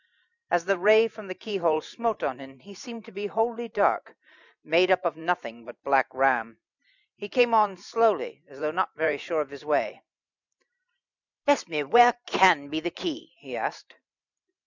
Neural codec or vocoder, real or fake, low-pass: vocoder, 44.1 kHz, 128 mel bands every 512 samples, BigVGAN v2; fake; 7.2 kHz